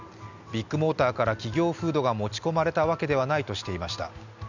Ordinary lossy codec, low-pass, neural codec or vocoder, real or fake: none; 7.2 kHz; none; real